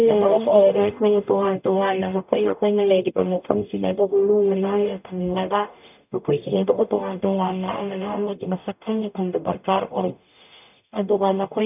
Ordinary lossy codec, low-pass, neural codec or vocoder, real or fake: none; 3.6 kHz; codec, 44.1 kHz, 0.9 kbps, DAC; fake